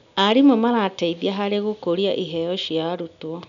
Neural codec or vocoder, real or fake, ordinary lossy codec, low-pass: none; real; none; 7.2 kHz